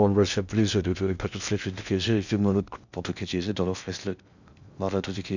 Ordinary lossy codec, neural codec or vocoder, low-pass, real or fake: none; codec, 16 kHz in and 24 kHz out, 0.6 kbps, FocalCodec, streaming, 2048 codes; 7.2 kHz; fake